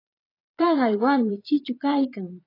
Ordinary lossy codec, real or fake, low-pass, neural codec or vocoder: AAC, 24 kbps; fake; 5.4 kHz; vocoder, 22.05 kHz, 80 mel bands, Vocos